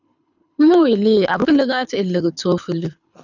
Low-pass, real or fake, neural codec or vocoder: 7.2 kHz; fake; codec, 24 kHz, 6 kbps, HILCodec